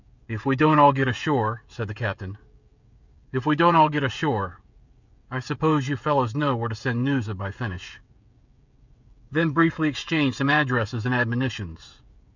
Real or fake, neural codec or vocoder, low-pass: fake; codec, 16 kHz, 16 kbps, FreqCodec, smaller model; 7.2 kHz